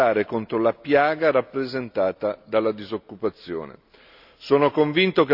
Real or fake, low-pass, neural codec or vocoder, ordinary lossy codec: real; 5.4 kHz; none; none